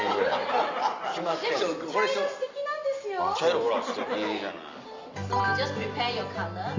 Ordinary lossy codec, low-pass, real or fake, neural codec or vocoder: MP3, 64 kbps; 7.2 kHz; real; none